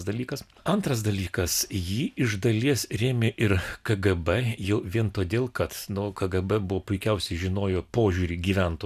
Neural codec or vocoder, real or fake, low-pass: none; real; 14.4 kHz